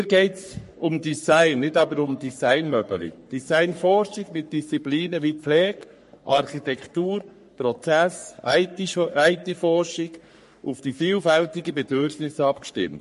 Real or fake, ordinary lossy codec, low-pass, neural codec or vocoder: fake; MP3, 48 kbps; 14.4 kHz; codec, 44.1 kHz, 3.4 kbps, Pupu-Codec